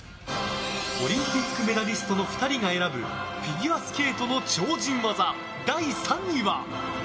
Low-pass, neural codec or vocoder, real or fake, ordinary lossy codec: none; none; real; none